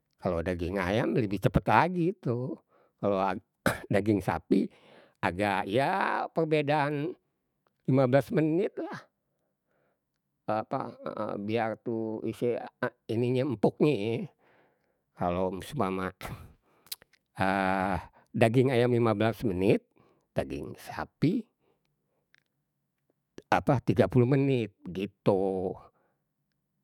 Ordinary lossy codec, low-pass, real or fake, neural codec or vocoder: none; 19.8 kHz; fake; autoencoder, 48 kHz, 128 numbers a frame, DAC-VAE, trained on Japanese speech